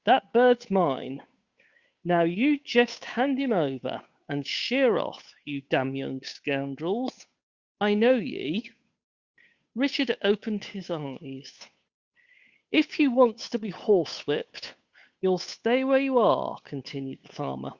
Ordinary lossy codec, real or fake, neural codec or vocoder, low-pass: Opus, 64 kbps; fake; codec, 16 kHz, 8 kbps, FunCodec, trained on Chinese and English, 25 frames a second; 7.2 kHz